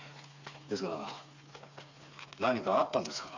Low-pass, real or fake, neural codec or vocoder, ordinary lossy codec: 7.2 kHz; fake; codec, 16 kHz, 4 kbps, FreqCodec, smaller model; AAC, 48 kbps